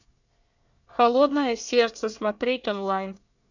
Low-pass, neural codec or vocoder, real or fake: 7.2 kHz; codec, 24 kHz, 1 kbps, SNAC; fake